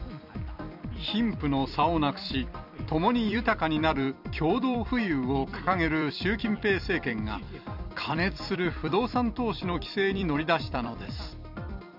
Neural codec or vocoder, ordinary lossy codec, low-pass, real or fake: vocoder, 44.1 kHz, 128 mel bands every 256 samples, BigVGAN v2; none; 5.4 kHz; fake